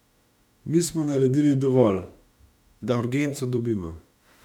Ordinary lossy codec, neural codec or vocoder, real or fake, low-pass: none; autoencoder, 48 kHz, 32 numbers a frame, DAC-VAE, trained on Japanese speech; fake; 19.8 kHz